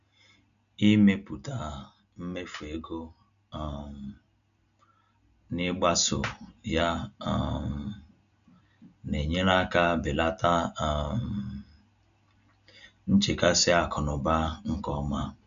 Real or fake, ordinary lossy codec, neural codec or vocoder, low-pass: real; none; none; 7.2 kHz